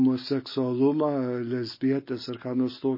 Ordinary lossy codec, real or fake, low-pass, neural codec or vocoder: MP3, 24 kbps; real; 5.4 kHz; none